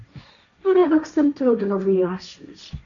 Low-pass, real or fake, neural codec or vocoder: 7.2 kHz; fake; codec, 16 kHz, 1.1 kbps, Voila-Tokenizer